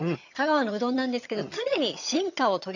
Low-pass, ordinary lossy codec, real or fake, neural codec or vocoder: 7.2 kHz; none; fake; vocoder, 22.05 kHz, 80 mel bands, HiFi-GAN